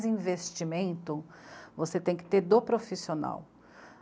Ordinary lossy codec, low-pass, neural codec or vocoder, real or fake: none; none; none; real